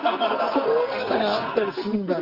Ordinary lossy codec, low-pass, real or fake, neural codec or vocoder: Opus, 24 kbps; 5.4 kHz; fake; codec, 44.1 kHz, 2.6 kbps, SNAC